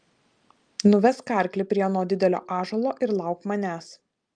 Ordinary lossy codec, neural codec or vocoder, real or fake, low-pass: Opus, 32 kbps; none; real; 9.9 kHz